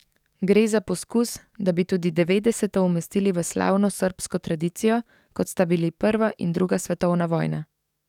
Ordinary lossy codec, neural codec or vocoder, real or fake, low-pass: none; codec, 44.1 kHz, 7.8 kbps, DAC; fake; 19.8 kHz